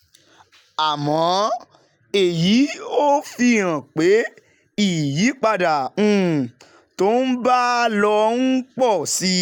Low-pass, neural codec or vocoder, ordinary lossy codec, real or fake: 19.8 kHz; none; none; real